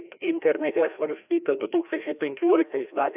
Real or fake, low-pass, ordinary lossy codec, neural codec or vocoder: fake; 3.6 kHz; AAC, 32 kbps; codec, 16 kHz, 1 kbps, FreqCodec, larger model